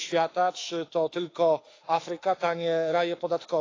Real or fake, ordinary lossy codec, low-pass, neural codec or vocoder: fake; AAC, 32 kbps; 7.2 kHz; autoencoder, 48 kHz, 32 numbers a frame, DAC-VAE, trained on Japanese speech